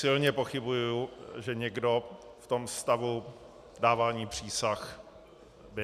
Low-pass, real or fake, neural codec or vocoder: 14.4 kHz; real; none